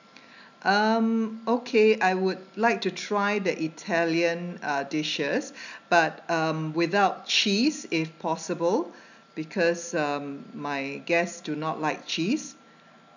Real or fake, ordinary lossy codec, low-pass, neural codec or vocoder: real; none; 7.2 kHz; none